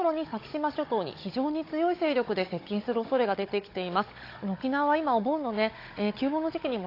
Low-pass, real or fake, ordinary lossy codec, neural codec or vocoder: 5.4 kHz; fake; AAC, 32 kbps; codec, 16 kHz, 16 kbps, FunCodec, trained on Chinese and English, 50 frames a second